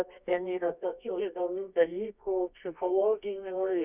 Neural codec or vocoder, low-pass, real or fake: codec, 24 kHz, 0.9 kbps, WavTokenizer, medium music audio release; 3.6 kHz; fake